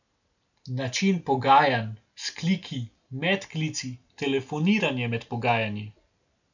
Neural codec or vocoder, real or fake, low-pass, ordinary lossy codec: none; real; 7.2 kHz; none